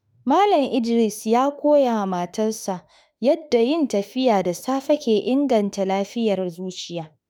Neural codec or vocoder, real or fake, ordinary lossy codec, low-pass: autoencoder, 48 kHz, 32 numbers a frame, DAC-VAE, trained on Japanese speech; fake; none; none